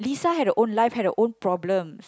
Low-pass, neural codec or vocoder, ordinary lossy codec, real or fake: none; none; none; real